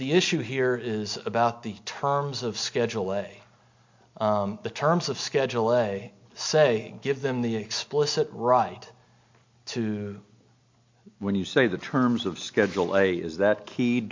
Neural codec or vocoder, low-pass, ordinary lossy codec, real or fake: none; 7.2 kHz; MP3, 48 kbps; real